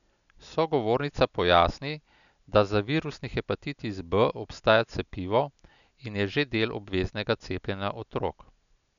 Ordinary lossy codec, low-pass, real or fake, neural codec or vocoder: none; 7.2 kHz; real; none